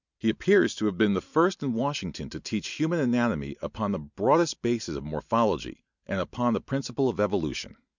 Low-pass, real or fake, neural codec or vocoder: 7.2 kHz; real; none